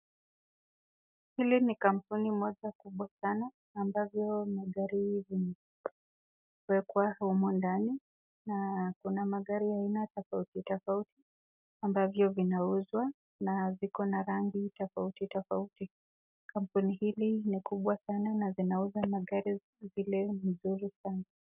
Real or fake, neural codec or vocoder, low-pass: real; none; 3.6 kHz